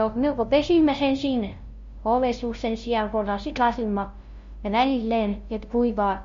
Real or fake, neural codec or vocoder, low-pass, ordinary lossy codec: fake; codec, 16 kHz, 0.5 kbps, FunCodec, trained on LibriTTS, 25 frames a second; 7.2 kHz; MP3, 48 kbps